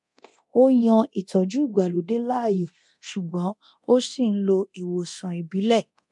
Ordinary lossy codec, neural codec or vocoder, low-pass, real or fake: MP3, 64 kbps; codec, 24 kHz, 0.9 kbps, DualCodec; 10.8 kHz; fake